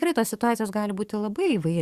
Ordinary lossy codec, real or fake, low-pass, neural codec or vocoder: Opus, 64 kbps; fake; 14.4 kHz; codec, 44.1 kHz, 7.8 kbps, DAC